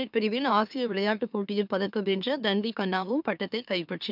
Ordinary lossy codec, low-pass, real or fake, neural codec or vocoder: none; 5.4 kHz; fake; autoencoder, 44.1 kHz, a latent of 192 numbers a frame, MeloTTS